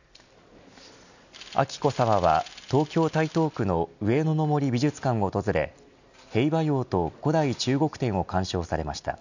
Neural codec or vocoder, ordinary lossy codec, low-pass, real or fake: none; none; 7.2 kHz; real